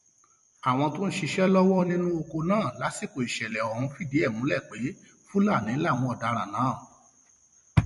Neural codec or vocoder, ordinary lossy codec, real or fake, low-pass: vocoder, 44.1 kHz, 128 mel bands every 256 samples, BigVGAN v2; MP3, 48 kbps; fake; 14.4 kHz